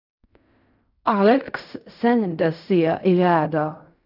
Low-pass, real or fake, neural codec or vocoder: 5.4 kHz; fake; codec, 16 kHz in and 24 kHz out, 0.4 kbps, LongCat-Audio-Codec, fine tuned four codebook decoder